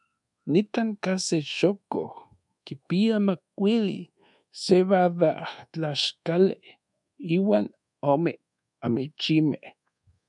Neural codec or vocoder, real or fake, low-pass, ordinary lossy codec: codec, 24 kHz, 1.2 kbps, DualCodec; fake; 10.8 kHz; MP3, 96 kbps